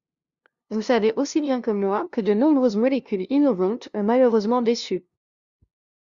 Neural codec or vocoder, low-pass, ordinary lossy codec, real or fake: codec, 16 kHz, 0.5 kbps, FunCodec, trained on LibriTTS, 25 frames a second; 7.2 kHz; Opus, 64 kbps; fake